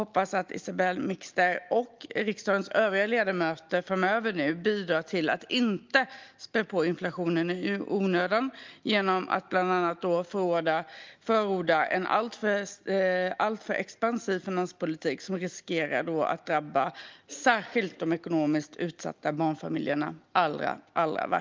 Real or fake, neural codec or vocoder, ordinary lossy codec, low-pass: real; none; Opus, 32 kbps; 7.2 kHz